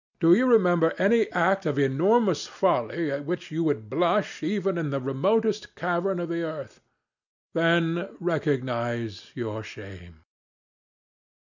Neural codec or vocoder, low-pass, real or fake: none; 7.2 kHz; real